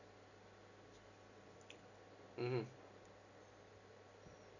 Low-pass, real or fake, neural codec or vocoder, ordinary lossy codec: 7.2 kHz; real; none; none